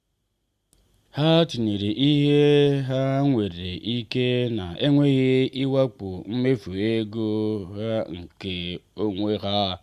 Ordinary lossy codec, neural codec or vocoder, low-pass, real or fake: Opus, 64 kbps; none; 14.4 kHz; real